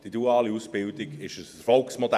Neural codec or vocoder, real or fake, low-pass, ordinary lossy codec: vocoder, 48 kHz, 128 mel bands, Vocos; fake; 14.4 kHz; none